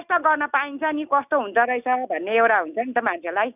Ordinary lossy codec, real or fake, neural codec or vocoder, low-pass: none; real; none; 3.6 kHz